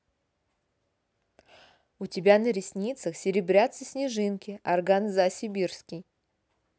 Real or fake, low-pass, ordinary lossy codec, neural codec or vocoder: real; none; none; none